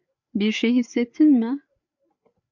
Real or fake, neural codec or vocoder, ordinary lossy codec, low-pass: fake; codec, 16 kHz, 4 kbps, FreqCodec, larger model; MP3, 64 kbps; 7.2 kHz